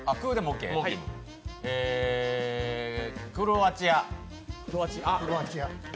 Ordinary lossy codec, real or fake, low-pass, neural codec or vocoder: none; real; none; none